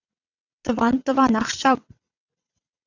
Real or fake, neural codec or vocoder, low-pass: fake; vocoder, 22.05 kHz, 80 mel bands, WaveNeXt; 7.2 kHz